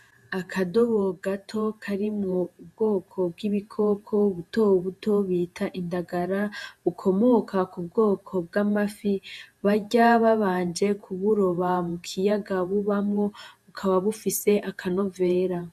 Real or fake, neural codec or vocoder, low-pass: fake; vocoder, 48 kHz, 128 mel bands, Vocos; 14.4 kHz